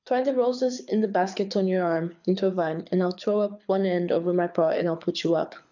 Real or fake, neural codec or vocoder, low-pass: fake; codec, 24 kHz, 6 kbps, HILCodec; 7.2 kHz